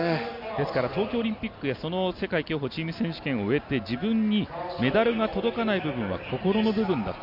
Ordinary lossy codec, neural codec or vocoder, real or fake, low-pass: none; none; real; 5.4 kHz